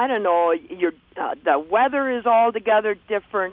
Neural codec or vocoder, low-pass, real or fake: none; 5.4 kHz; real